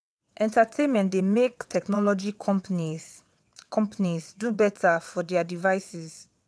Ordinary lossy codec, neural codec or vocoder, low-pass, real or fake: none; vocoder, 22.05 kHz, 80 mel bands, WaveNeXt; none; fake